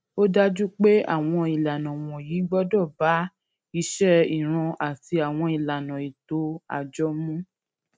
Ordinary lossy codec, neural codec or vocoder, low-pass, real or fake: none; none; none; real